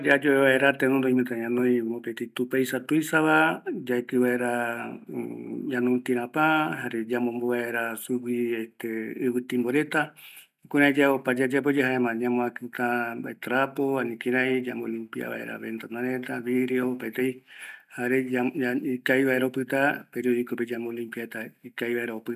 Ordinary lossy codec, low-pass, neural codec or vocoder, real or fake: none; 14.4 kHz; none; real